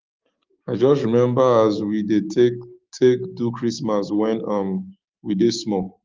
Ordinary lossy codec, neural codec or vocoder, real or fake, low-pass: Opus, 32 kbps; codec, 16 kHz, 6 kbps, DAC; fake; 7.2 kHz